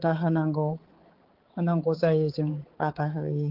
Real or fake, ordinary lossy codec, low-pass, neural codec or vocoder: fake; Opus, 16 kbps; 5.4 kHz; codec, 16 kHz, 4 kbps, X-Codec, HuBERT features, trained on balanced general audio